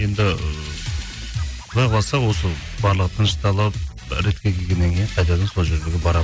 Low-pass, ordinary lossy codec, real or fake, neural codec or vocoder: none; none; real; none